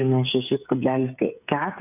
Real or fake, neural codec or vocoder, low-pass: fake; codec, 16 kHz, 8 kbps, FreqCodec, smaller model; 3.6 kHz